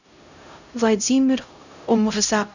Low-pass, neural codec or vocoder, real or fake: 7.2 kHz; codec, 16 kHz, 0.5 kbps, X-Codec, HuBERT features, trained on LibriSpeech; fake